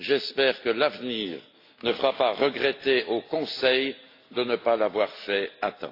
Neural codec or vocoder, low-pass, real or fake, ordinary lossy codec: none; 5.4 kHz; real; AAC, 32 kbps